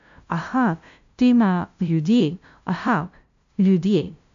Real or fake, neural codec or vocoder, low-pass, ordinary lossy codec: fake; codec, 16 kHz, 0.5 kbps, FunCodec, trained on LibriTTS, 25 frames a second; 7.2 kHz; AAC, 64 kbps